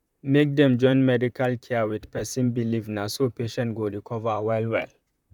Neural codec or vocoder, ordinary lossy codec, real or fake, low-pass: vocoder, 44.1 kHz, 128 mel bands, Pupu-Vocoder; none; fake; 19.8 kHz